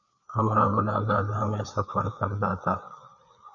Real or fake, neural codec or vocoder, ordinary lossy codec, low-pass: fake; codec, 16 kHz, 4 kbps, FreqCodec, larger model; MP3, 96 kbps; 7.2 kHz